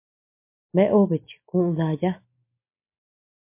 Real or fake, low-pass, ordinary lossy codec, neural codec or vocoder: real; 3.6 kHz; MP3, 24 kbps; none